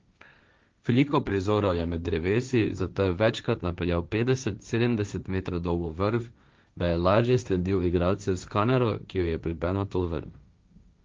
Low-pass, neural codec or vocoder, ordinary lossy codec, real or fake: 7.2 kHz; codec, 16 kHz, 1.1 kbps, Voila-Tokenizer; Opus, 24 kbps; fake